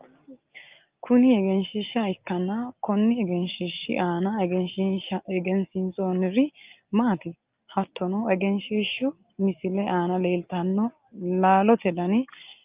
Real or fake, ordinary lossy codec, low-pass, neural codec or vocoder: real; Opus, 24 kbps; 3.6 kHz; none